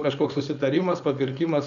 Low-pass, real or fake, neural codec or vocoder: 7.2 kHz; fake; codec, 16 kHz, 4.8 kbps, FACodec